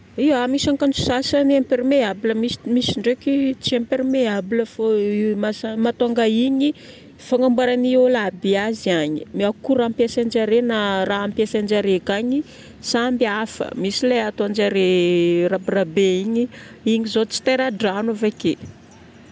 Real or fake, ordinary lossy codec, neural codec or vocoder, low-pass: real; none; none; none